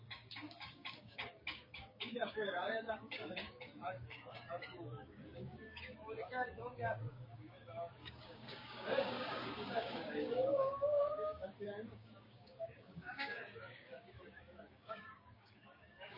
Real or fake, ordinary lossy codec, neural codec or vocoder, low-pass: fake; MP3, 24 kbps; codec, 16 kHz, 6 kbps, DAC; 5.4 kHz